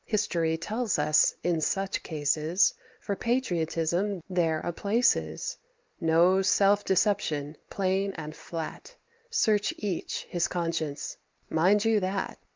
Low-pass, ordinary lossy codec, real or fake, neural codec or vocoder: 7.2 kHz; Opus, 32 kbps; real; none